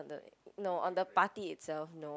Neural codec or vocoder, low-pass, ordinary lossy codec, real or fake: none; none; none; real